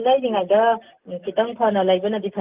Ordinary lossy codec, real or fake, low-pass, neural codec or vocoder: Opus, 32 kbps; real; 3.6 kHz; none